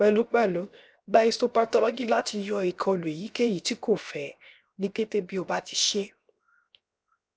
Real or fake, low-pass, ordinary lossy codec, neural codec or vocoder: fake; none; none; codec, 16 kHz, 0.7 kbps, FocalCodec